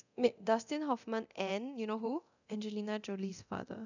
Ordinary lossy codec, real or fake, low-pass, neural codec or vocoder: none; fake; 7.2 kHz; codec, 24 kHz, 0.9 kbps, DualCodec